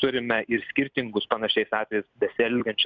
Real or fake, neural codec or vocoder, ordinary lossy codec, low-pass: real; none; Opus, 64 kbps; 7.2 kHz